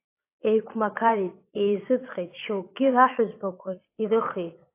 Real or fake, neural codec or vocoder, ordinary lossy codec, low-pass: fake; vocoder, 22.05 kHz, 80 mel bands, Vocos; AAC, 24 kbps; 3.6 kHz